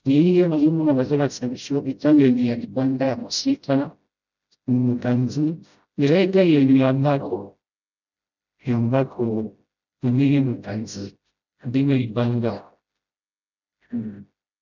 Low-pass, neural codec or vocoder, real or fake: 7.2 kHz; codec, 16 kHz, 0.5 kbps, FreqCodec, smaller model; fake